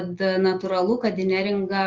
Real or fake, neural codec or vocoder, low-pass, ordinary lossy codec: real; none; 7.2 kHz; Opus, 32 kbps